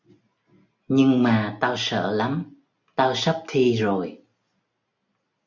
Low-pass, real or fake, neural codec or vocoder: 7.2 kHz; real; none